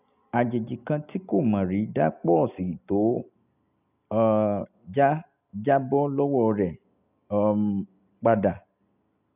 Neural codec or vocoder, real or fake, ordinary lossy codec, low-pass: none; real; none; 3.6 kHz